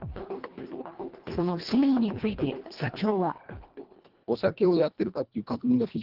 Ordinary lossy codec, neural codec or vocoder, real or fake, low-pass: Opus, 16 kbps; codec, 24 kHz, 1.5 kbps, HILCodec; fake; 5.4 kHz